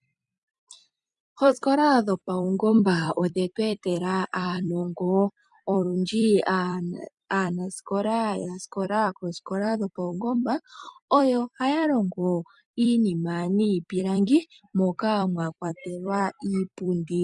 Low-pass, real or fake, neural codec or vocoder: 10.8 kHz; fake; vocoder, 44.1 kHz, 128 mel bands every 256 samples, BigVGAN v2